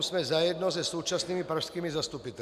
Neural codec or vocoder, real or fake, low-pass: none; real; 14.4 kHz